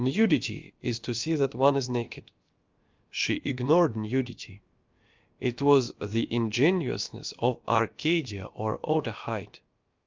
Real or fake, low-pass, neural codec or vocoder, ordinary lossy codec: fake; 7.2 kHz; codec, 16 kHz, about 1 kbps, DyCAST, with the encoder's durations; Opus, 24 kbps